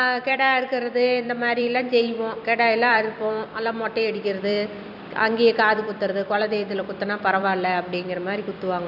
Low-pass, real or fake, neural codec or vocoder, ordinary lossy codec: 5.4 kHz; fake; vocoder, 44.1 kHz, 128 mel bands every 256 samples, BigVGAN v2; none